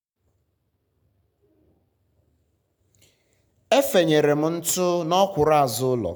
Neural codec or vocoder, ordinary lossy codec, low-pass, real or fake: none; none; none; real